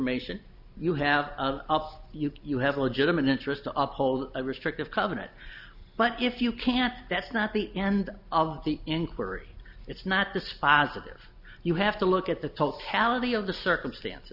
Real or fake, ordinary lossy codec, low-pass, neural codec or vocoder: real; Opus, 64 kbps; 5.4 kHz; none